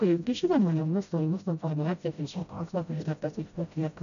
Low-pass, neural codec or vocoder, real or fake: 7.2 kHz; codec, 16 kHz, 0.5 kbps, FreqCodec, smaller model; fake